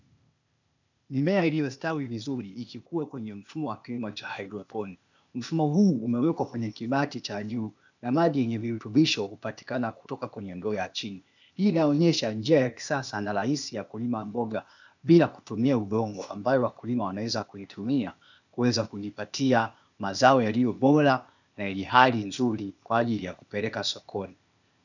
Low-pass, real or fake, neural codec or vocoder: 7.2 kHz; fake; codec, 16 kHz, 0.8 kbps, ZipCodec